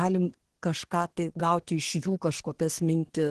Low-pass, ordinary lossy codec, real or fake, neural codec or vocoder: 10.8 kHz; Opus, 16 kbps; fake; codec, 24 kHz, 1 kbps, SNAC